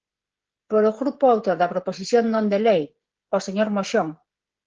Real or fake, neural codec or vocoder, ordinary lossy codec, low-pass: fake; codec, 16 kHz, 16 kbps, FreqCodec, smaller model; Opus, 16 kbps; 7.2 kHz